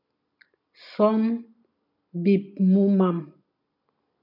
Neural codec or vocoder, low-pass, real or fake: none; 5.4 kHz; real